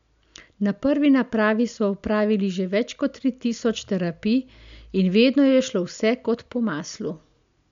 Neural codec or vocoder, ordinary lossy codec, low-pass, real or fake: none; MP3, 64 kbps; 7.2 kHz; real